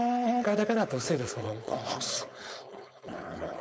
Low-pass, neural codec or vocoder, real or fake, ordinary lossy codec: none; codec, 16 kHz, 4.8 kbps, FACodec; fake; none